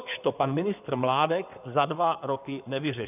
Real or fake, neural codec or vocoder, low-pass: fake; codec, 16 kHz in and 24 kHz out, 2.2 kbps, FireRedTTS-2 codec; 3.6 kHz